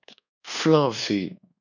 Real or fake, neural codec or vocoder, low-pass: fake; codec, 24 kHz, 1.2 kbps, DualCodec; 7.2 kHz